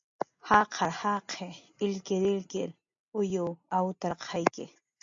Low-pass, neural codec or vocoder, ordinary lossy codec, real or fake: 7.2 kHz; none; AAC, 64 kbps; real